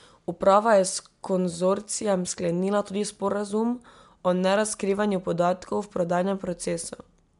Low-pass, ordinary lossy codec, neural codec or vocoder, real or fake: 10.8 kHz; MP3, 64 kbps; none; real